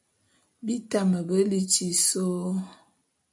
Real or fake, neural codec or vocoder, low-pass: real; none; 10.8 kHz